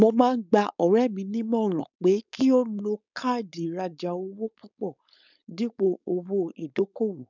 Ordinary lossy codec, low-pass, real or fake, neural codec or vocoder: none; 7.2 kHz; fake; codec, 16 kHz, 4.8 kbps, FACodec